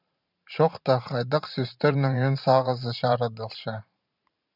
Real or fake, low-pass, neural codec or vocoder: fake; 5.4 kHz; vocoder, 44.1 kHz, 128 mel bands every 512 samples, BigVGAN v2